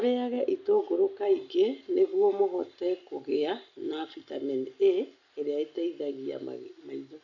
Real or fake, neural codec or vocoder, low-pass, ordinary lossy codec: real; none; 7.2 kHz; none